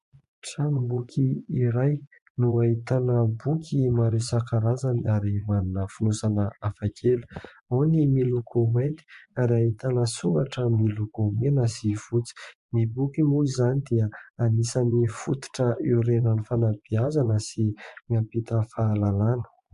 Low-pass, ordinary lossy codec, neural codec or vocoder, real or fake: 10.8 kHz; MP3, 64 kbps; vocoder, 24 kHz, 100 mel bands, Vocos; fake